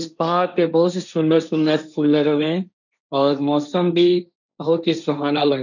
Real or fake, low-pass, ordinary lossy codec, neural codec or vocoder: fake; 7.2 kHz; none; codec, 16 kHz, 1.1 kbps, Voila-Tokenizer